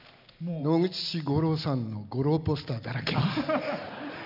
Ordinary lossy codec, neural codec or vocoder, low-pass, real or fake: none; none; 5.4 kHz; real